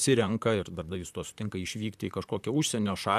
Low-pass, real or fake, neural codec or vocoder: 14.4 kHz; fake; vocoder, 44.1 kHz, 128 mel bands, Pupu-Vocoder